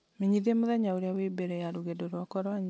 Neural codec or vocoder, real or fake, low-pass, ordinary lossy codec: none; real; none; none